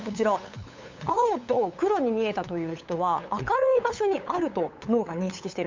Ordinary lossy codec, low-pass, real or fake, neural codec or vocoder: MP3, 64 kbps; 7.2 kHz; fake; codec, 16 kHz, 8 kbps, FunCodec, trained on LibriTTS, 25 frames a second